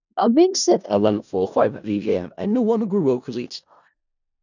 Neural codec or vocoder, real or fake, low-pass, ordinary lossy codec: codec, 16 kHz in and 24 kHz out, 0.4 kbps, LongCat-Audio-Codec, four codebook decoder; fake; 7.2 kHz; none